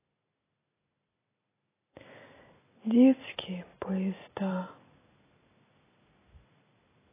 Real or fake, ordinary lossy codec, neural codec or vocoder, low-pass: real; AAC, 16 kbps; none; 3.6 kHz